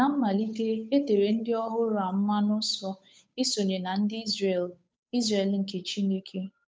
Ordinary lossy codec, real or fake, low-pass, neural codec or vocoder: none; fake; none; codec, 16 kHz, 8 kbps, FunCodec, trained on Chinese and English, 25 frames a second